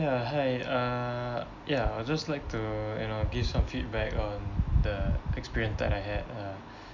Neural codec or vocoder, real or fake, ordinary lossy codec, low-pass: none; real; MP3, 48 kbps; 7.2 kHz